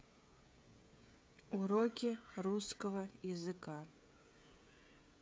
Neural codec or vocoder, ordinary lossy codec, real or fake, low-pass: codec, 16 kHz, 16 kbps, FreqCodec, smaller model; none; fake; none